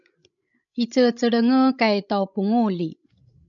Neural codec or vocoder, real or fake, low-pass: codec, 16 kHz, 16 kbps, FreqCodec, larger model; fake; 7.2 kHz